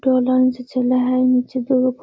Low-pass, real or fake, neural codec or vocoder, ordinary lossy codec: none; real; none; none